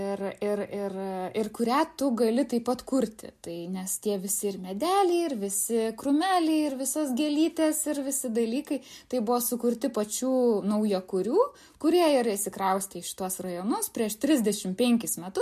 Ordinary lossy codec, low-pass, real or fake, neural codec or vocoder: MP3, 64 kbps; 14.4 kHz; real; none